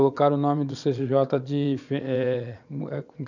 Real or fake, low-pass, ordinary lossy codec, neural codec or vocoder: fake; 7.2 kHz; none; vocoder, 22.05 kHz, 80 mel bands, Vocos